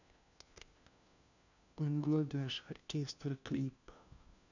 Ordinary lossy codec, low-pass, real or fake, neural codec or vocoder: none; 7.2 kHz; fake; codec, 16 kHz, 1 kbps, FunCodec, trained on LibriTTS, 50 frames a second